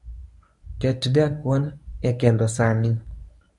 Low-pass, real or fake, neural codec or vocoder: 10.8 kHz; fake; codec, 24 kHz, 0.9 kbps, WavTokenizer, medium speech release version 1